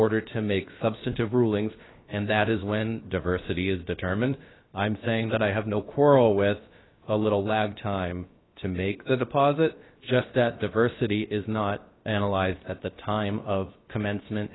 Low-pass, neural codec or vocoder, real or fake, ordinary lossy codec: 7.2 kHz; codec, 16 kHz, about 1 kbps, DyCAST, with the encoder's durations; fake; AAC, 16 kbps